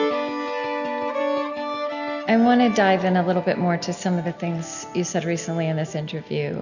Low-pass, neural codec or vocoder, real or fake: 7.2 kHz; none; real